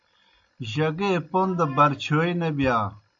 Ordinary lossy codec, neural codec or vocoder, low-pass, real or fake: AAC, 64 kbps; none; 7.2 kHz; real